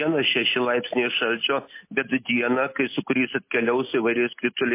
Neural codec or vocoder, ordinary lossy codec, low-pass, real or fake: none; MP3, 24 kbps; 3.6 kHz; real